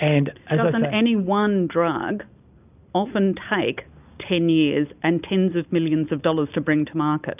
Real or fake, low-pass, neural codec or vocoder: real; 3.6 kHz; none